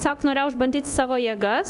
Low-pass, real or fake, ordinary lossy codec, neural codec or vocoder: 10.8 kHz; fake; AAC, 96 kbps; codec, 24 kHz, 0.9 kbps, DualCodec